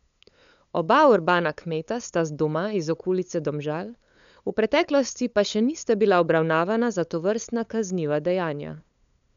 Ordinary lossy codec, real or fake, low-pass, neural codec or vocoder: none; fake; 7.2 kHz; codec, 16 kHz, 8 kbps, FunCodec, trained on LibriTTS, 25 frames a second